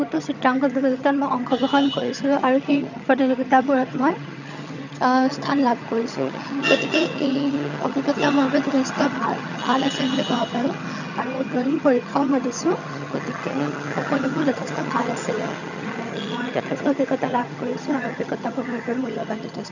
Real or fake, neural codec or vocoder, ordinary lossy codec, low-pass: fake; vocoder, 22.05 kHz, 80 mel bands, HiFi-GAN; none; 7.2 kHz